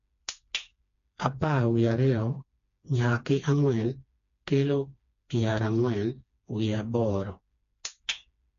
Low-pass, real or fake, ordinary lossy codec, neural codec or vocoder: 7.2 kHz; fake; MP3, 48 kbps; codec, 16 kHz, 2 kbps, FreqCodec, smaller model